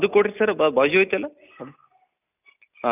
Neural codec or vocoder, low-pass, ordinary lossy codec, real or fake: none; 3.6 kHz; none; real